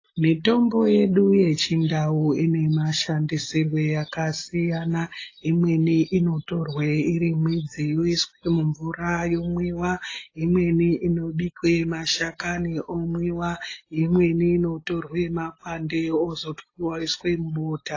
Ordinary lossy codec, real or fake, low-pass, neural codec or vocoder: AAC, 32 kbps; real; 7.2 kHz; none